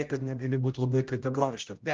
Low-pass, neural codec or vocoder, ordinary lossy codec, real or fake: 7.2 kHz; codec, 16 kHz, 0.5 kbps, X-Codec, HuBERT features, trained on general audio; Opus, 24 kbps; fake